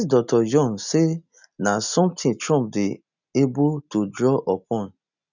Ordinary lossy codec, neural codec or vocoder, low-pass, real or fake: none; none; 7.2 kHz; real